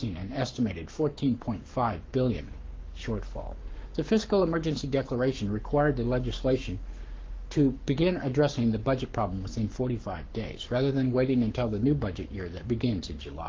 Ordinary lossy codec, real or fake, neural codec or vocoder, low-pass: Opus, 32 kbps; fake; codec, 44.1 kHz, 7.8 kbps, Pupu-Codec; 7.2 kHz